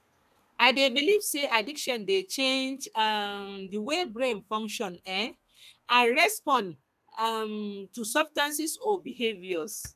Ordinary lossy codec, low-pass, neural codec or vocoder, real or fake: none; 14.4 kHz; codec, 32 kHz, 1.9 kbps, SNAC; fake